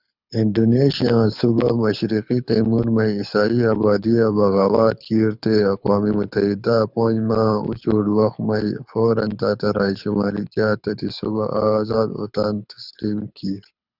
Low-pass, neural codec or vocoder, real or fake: 5.4 kHz; codec, 24 kHz, 6 kbps, HILCodec; fake